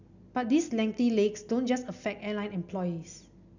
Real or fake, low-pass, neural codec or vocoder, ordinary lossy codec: real; 7.2 kHz; none; none